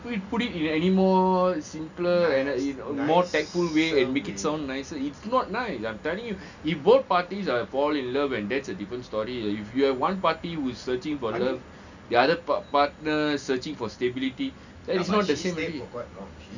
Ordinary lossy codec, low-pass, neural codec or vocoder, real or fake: none; 7.2 kHz; none; real